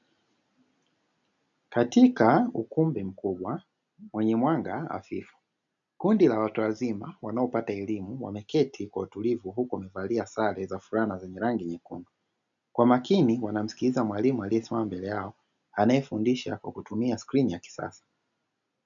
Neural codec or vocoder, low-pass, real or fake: none; 7.2 kHz; real